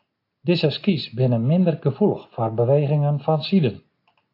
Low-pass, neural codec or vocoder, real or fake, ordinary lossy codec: 5.4 kHz; none; real; AAC, 32 kbps